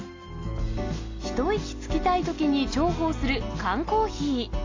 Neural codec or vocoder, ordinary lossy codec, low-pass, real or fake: none; AAC, 32 kbps; 7.2 kHz; real